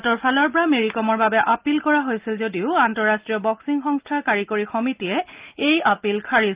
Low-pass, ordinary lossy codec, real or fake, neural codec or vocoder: 3.6 kHz; Opus, 32 kbps; real; none